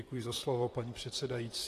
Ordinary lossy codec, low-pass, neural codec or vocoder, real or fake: AAC, 48 kbps; 14.4 kHz; vocoder, 44.1 kHz, 128 mel bands, Pupu-Vocoder; fake